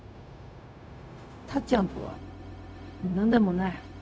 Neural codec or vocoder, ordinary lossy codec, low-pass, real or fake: codec, 16 kHz, 0.4 kbps, LongCat-Audio-Codec; none; none; fake